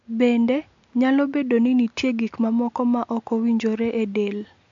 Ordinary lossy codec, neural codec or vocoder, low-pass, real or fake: MP3, 48 kbps; none; 7.2 kHz; real